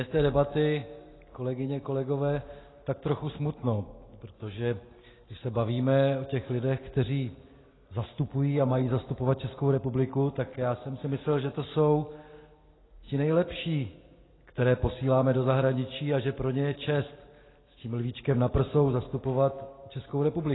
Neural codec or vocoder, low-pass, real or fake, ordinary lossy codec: none; 7.2 kHz; real; AAC, 16 kbps